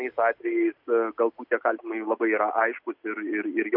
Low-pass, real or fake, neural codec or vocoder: 5.4 kHz; real; none